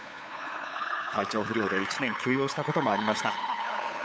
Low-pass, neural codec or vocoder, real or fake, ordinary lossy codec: none; codec, 16 kHz, 8 kbps, FunCodec, trained on LibriTTS, 25 frames a second; fake; none